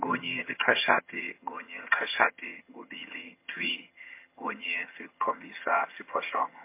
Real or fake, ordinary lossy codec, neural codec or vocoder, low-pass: fake; MP3, 24 kbps; vocoder, 22.05 kHz, 80 mel bands, HiFi-GAN; 3.6 kHz